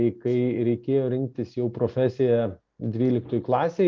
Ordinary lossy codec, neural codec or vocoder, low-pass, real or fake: Opus, 32 kbps; none; 7.2 kHz; real